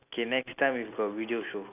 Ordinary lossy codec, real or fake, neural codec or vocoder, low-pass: none; real; none; 3.6 kHz